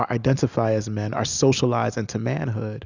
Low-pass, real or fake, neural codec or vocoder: 7.2 kHz; real; none